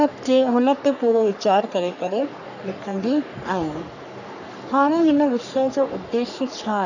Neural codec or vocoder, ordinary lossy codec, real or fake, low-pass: codec, 44.1 kHz, 3.4 kbps, Pupu-Codec; none; fake; 7.2 kHz